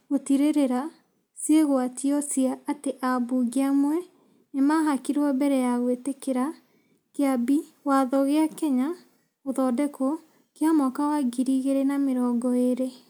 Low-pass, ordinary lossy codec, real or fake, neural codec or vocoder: none; none; real; none